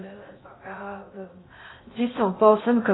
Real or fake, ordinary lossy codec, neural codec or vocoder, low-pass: fake; AAC, 16 kbps; codec, 16 kHz in and 24 kHz out, 0.6 kbps, FocalCodec, streaming, 2048 codes; 7.2 kHz